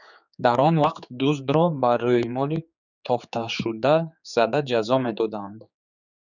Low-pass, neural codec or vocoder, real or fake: 7.2 kHz; codec, 16 kHz, 4 kbps, X-Codec, HuBERT features, trained on general audio; fake